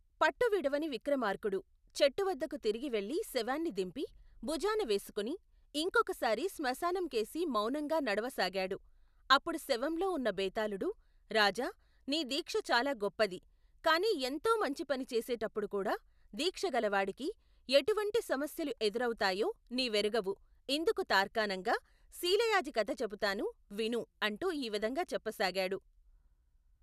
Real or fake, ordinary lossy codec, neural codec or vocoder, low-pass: real; none; none; 14.4 kHz